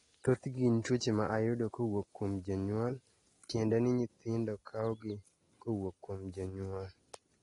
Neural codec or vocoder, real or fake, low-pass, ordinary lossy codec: none; real; 10.8 kHz; AAC, 32 kbps